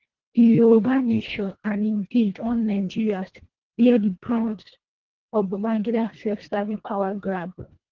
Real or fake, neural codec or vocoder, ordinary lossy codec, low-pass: fake; codec, 24 kHz, 1.5 kbps, HILCodec; Opus, 16 kbps; 7.2 kHz